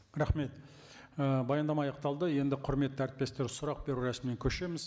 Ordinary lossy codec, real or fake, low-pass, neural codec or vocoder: none; real; none; none